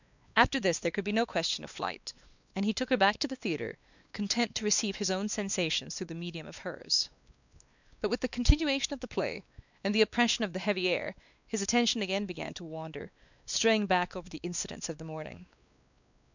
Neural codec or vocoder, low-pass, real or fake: codec, 16 kHz, 2 kbps, X-Codec, WavLM features, trained on Multilingual LibriSpeech; 7.2 kHz; fake